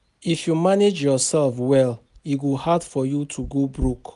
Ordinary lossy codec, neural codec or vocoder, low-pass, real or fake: none; none; 10.8 kHz; real